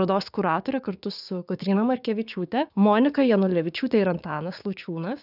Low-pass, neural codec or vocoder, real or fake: 5.4 kHz; codec, 16 kHz, 6 kbps, DAC; fake